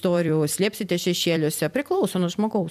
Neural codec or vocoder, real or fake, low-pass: vocoder, 44.1 kHz, 128 mel bands every 256 samples, BigVGAN v2; fake; 14.4 kHz